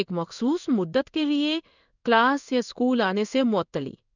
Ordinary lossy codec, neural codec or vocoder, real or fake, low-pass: none; codec, 16 kHz in and 24 kHz out, 1 kbps, XY-Tokenizer; fake; 7.2 kHz